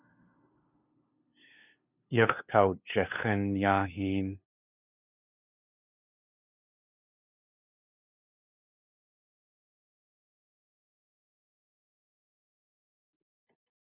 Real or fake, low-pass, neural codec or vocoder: fake; 3.6 kHz; codec, 16 kHz, 2 kbps, FunCodec, trained on LibriTTS, 25 frames a second